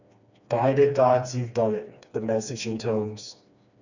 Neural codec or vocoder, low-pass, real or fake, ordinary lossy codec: codec, 16 kHz, 2 kbps, FreqCodec, smaller model; 7.2 kHz; fake; none